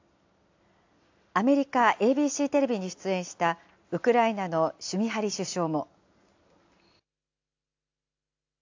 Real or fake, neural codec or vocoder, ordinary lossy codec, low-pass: real; none; AAC, 48 kbps; 7.2 kHz